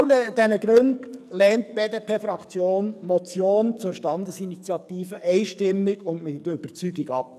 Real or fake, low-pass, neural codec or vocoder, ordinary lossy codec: fake; 14.4 kHz; codec, 44.1 kHz, 2.6 kbps, SNAC; none